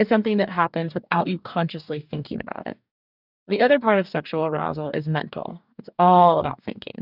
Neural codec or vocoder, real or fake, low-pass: codec, 44.1 kHz, 2.6 kbps, DAC; fake; 5.4 kHz